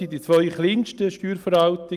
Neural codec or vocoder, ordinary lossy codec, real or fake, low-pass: none; Opus, 32 kbps; real; 14.4 kHz